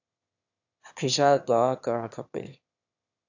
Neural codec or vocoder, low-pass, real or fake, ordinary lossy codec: autoencoder, 22.05 kHz, a latent of 192 numbers a frame, VITS, trained on one speaker; 7.2 kHz; fake; AAC, 48 kbps